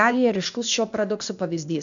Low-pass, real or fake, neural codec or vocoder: 7.2 kHz; fake; codec, 16 kHz, 0.8 kbps, ZipCodec